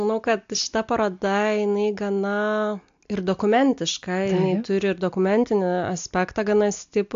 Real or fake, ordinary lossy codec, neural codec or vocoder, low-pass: real; AAC, 64 kbps; none; 7.2 kHz